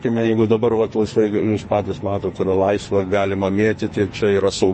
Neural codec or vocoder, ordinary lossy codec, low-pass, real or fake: codec, 44.1 kHz, 2.6 kbps, SNAC; MP3, 32 kbps; 10.8 kHz; fake